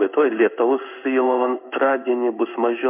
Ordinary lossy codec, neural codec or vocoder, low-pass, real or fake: MP3, 32 kbps; codec, 16 kHz in and 24 kHz out, 1 kbps, XY-Tokenizer; 3.6 kHz; fake